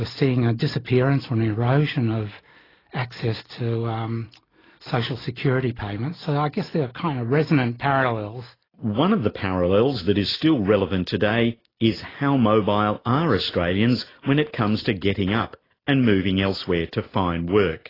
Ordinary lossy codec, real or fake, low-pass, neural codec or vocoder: AAC, 24 kbps; real; 5.4 kHz; none